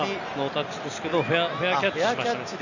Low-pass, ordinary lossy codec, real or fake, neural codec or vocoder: 7.2 kHz; none; real; none